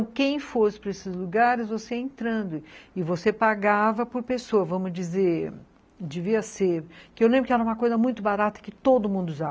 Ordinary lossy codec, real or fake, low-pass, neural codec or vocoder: none; real; none; none